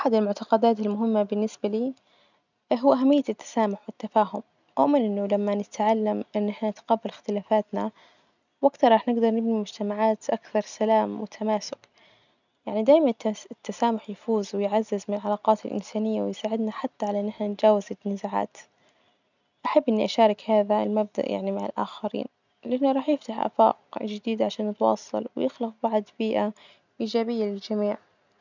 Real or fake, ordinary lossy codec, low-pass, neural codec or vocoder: real; none; 7.2 kHz; none